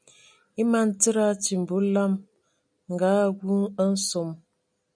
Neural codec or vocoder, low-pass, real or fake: none; 9.9 kHz; real